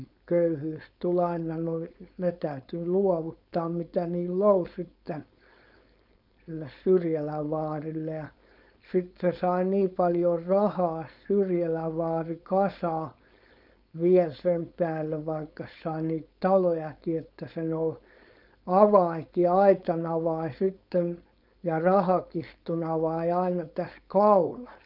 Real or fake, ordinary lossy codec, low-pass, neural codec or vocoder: fake; none; 5.4 kHz; codec, 16 kHz, 4.8 kbps, FACodec